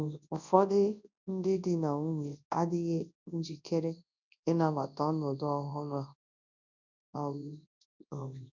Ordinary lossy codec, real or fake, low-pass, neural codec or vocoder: none; fake; 7.2 kHz; codec, 24 kHz, 0.9 kbps, WavTokenizer, large speech release